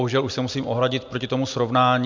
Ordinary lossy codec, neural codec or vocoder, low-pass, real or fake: MP3, 64 kbps; none; 7.2 kHz; real